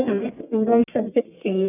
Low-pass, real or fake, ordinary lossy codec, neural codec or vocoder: 3.6 kHz; fake; none; codec, 44.1 kHz, 1.7 kbps, Pupu-Codec